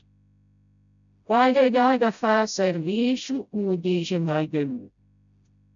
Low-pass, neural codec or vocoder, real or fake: 7.2 kHz; codec, 16 kHz, 0.5 kbps, FreqCodec, smaller model; fake